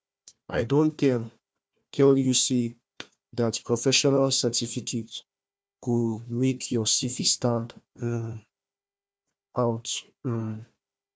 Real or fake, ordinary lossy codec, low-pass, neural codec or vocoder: fake; none; none; codec, 16 kHz, 1 kbps, FunCodec, trained on Chinese and English, 50 frames a second